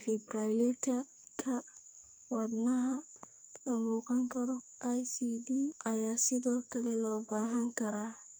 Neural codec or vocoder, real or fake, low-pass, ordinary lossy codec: codec, 44.1 kHz, 2.6 kbps, SNAC; fake; none; none